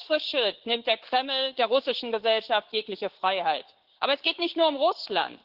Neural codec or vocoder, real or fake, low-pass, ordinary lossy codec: codec, 16 kHz, 6 kbps, DAC; fake; 5.4 kHz; Opus, 16 kbps